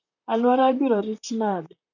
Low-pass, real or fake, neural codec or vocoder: 7.2 kHz; fake; vocoder, 44.1 kHz, 128 mel bands, Pupu-Vocoder